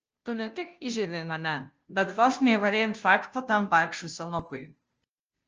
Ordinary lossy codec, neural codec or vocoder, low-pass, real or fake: Opus, 24 kbps; codec, 16 kHz, 0.5 kbps, FunCodec, trained on Chinese and English, 25 frames a second; 7.2 kHz; fake